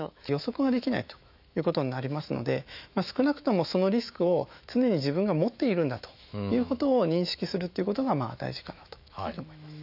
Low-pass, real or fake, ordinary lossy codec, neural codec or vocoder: 5.4 kHz; fake; none; autoencoder, 48 kHz, 128 numbers a frame, DAC-VAE, trained on Japanese speech